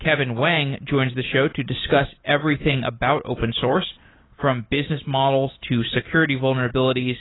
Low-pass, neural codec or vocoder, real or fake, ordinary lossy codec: 7.2 kHz; none; real; AAC, 16 kbps